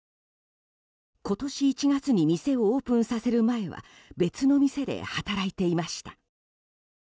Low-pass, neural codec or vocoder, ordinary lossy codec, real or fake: none; none; none; real